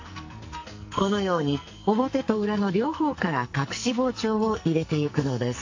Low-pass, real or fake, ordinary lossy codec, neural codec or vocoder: 7.2 kHz; fake; AAC, 48 kbps; codec, 44.1 kHz, 2.6 kbps, SNAC